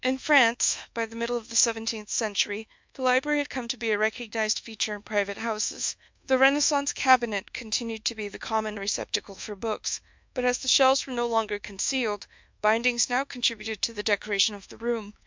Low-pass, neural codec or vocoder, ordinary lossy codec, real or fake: 7.2 kHz; codec, 24 kHz, 1.2 kbps, DualCodec; MP3, 64 kbps; fake